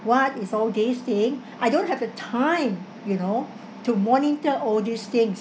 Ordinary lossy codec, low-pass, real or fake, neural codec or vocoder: none; none; real; none